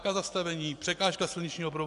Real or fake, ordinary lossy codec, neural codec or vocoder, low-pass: real; AAC, 64 kbps; none; 10.8 kHz